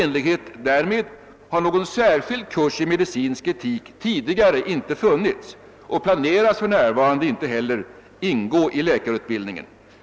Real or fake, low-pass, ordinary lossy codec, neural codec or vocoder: real; none; none; none